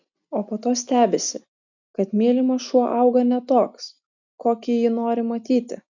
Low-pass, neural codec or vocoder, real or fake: 7.2 kHz; none; real